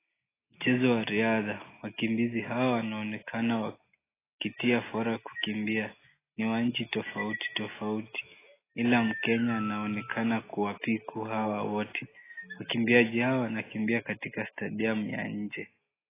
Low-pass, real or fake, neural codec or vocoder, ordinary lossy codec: 3.6 kHz; real; none; AAC, 16 kbps